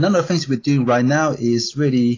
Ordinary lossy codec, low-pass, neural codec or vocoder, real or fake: AAC, 32 kbps; 7.2 kHz; none; real